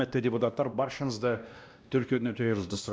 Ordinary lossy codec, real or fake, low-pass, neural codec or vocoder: none; fake; none; codec, 16 kHz, 1 kbps, X-Codec, WavLM features, trained on Multilingual LibriSpeech